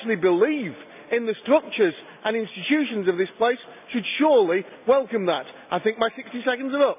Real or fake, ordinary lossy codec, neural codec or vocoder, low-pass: real; none; none; 3.6 kHz